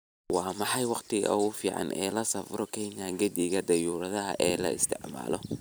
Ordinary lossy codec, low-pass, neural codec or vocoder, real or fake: none; none; vocoder, 44.1 kHz, 128 mel bands every 256 samples, BigVGAN v2; fake